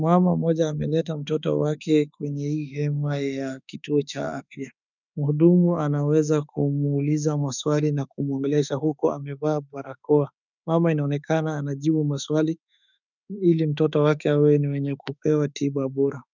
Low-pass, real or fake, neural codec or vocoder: 7.2 kHz; fake; autoencoder, 48 kHz, 32 numbers a frame, DAC-VAE, trained on Japanese speech